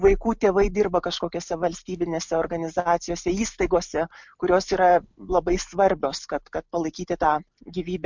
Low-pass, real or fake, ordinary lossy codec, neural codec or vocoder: 7.2 kHz; real; MP3, 64 kbps; none